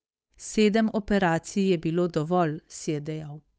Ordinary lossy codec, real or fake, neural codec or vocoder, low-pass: none; fake; codec, 16 kHz, 8 kbps, FunCodec, trained on Chinese and English, 25 frames a second; none